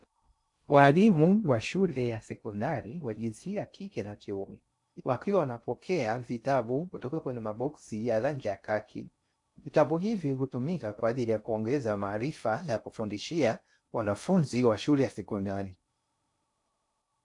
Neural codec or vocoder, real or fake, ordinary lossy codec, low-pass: codec, 16 kHz in and 24 kHz out, 0.6 kbps, FocalCodec, streaming, 2048 codes; fake; AAC, 64 kbps; 10.8 kHz